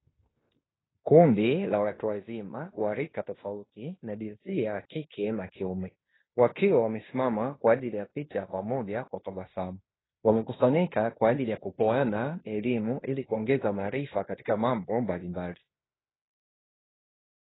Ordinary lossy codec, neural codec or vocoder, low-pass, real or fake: AAC, 16 kbps; codec, 16 kHz in and 24 kHz out, 0.9 kbps, LongCat-Audio-Codec, fine tuned four codebook decoder; 7.2 kHz; fake